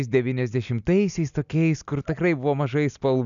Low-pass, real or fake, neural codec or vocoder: 7.2 kHz; real; none